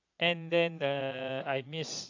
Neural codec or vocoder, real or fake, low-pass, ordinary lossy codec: vocoder, 22.05 kHz, 80 mel bands, Vocos; fake; 7.2 kHz; AAC, 48 kbps